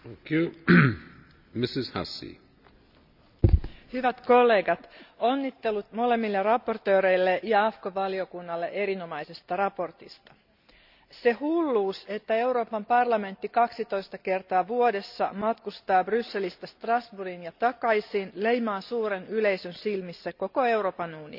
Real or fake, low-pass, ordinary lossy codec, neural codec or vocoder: real; 5.4 kHz; none; none